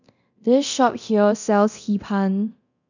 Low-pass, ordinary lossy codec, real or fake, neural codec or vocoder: 7.2 kHz; none; fake; codec, 24 kHz, 0.9 kbps, DualCodec